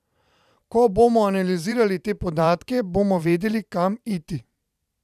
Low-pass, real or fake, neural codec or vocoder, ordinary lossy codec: 14.4 kHz; fake; vocoder, 44.1 kHz, 128 mel bands every 512 samples, BigVGAN v2; none